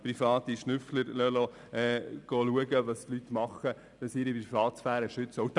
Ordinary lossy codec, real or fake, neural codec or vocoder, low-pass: none; real; none; 10.8 kHz